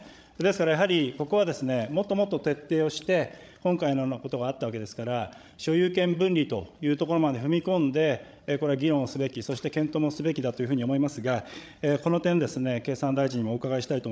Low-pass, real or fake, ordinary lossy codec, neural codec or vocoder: none; fake; none; codec, 16 kHz, 16 kbps, FreqCodec, larger model